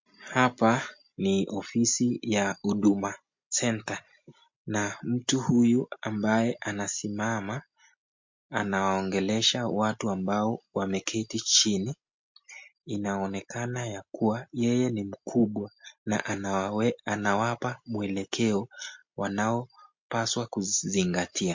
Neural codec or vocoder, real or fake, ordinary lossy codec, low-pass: none; real; MP3, 48 kbps; 7.2 kHz